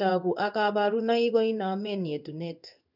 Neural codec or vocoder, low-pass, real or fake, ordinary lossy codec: codec, 16 kHz in and 24 kHz out, 1 kbps, XY-Tokenizer; 5.4 kHz; fake; none